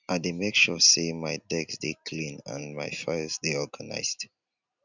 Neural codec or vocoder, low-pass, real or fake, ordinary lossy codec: none; 7.2 kHz; real; none